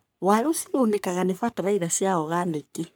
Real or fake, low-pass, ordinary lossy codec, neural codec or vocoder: fake; none; none; codec, 44.1 kHz, 3.4 kbps, Pupu-Codec